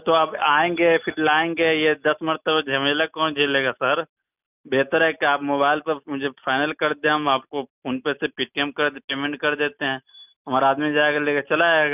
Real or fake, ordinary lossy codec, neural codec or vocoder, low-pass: real; none; none; 3.6 kHz